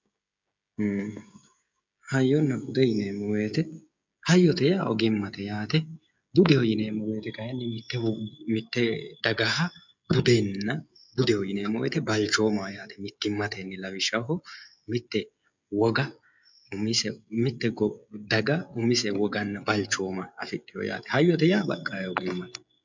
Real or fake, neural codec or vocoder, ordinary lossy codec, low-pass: fake; codec, 16 kHz, 16 kbps, FreqCodec, smaller model; MP3, 64 kbps; 7.2 kHz